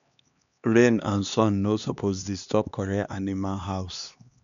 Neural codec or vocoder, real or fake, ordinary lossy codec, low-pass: codec, 16 kHz, 2 kbps, X-Codec, HuBERT features, trained on LibriSpeech; fake; none; 7.2 kHz